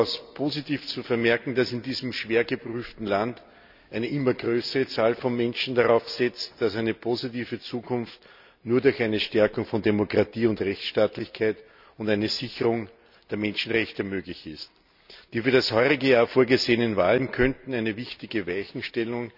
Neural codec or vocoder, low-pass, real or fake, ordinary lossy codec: none; 5.4 kHz; real; none